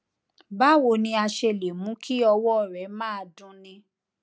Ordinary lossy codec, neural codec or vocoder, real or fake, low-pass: none; none; real; none